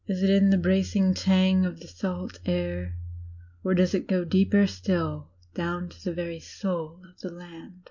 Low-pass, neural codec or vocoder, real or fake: 7.2 kHz; none; real